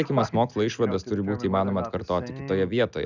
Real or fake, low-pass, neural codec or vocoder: real; 7.2 kHz; none